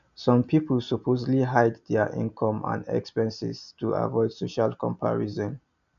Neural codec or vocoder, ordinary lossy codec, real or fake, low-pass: none; none; real; 7.2 kHz